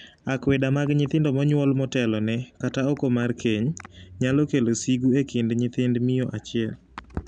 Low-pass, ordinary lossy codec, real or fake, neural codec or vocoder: 9.9 kHz; none; real; none